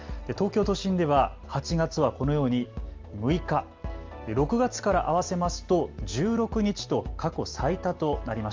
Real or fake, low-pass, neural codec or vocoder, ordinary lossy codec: real; 7.2 kHz; none; Opus, 32 kbps